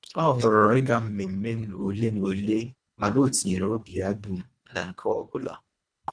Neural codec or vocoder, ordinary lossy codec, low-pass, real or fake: codec, 24 kHz, 1.5 kbps, HILCodec; none; 9.9 kHz; fake